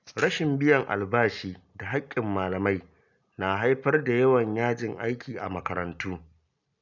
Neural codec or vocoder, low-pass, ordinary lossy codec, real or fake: none; 7.2 kHz; none; real